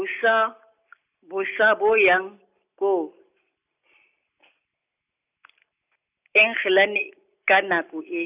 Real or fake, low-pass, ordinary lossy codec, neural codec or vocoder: real; 3.6 kHz; none; none